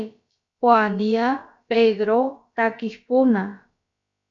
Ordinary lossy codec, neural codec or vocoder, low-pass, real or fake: MP3, 64 kbps; codec, 16 kHz, about 1 kbps, DyCAST, with the encoder's durations; 7.2 kHz; fake